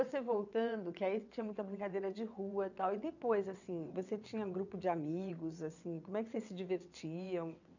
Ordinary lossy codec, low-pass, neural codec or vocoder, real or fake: none; 7.2 kHz; vocoder, 22.05 kHz, 80 mel bands, WaveNeXt; fake